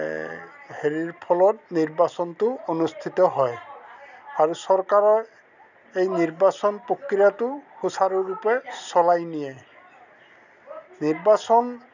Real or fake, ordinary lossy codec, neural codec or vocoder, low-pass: real; none; none; 7.2 kHz